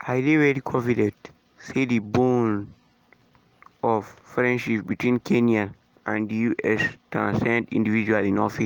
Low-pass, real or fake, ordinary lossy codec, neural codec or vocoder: 19.8 kHz; real; Opus, 24 kbps; none